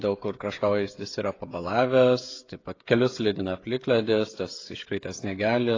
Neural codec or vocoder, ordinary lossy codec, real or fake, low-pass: codec, 16 kHz, 16 kbps, FreqCodec, smaller model; AAC, 32 kbps; fake; 7.2 kHz